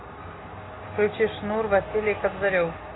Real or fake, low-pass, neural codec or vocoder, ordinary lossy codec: real; 7.2 kHz; none; AAC, 16 kbps